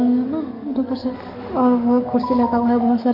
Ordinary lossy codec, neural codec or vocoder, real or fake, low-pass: none; codec, 44.1 kHz, 7.8 kbps, DAC; fake; 5.4 kHz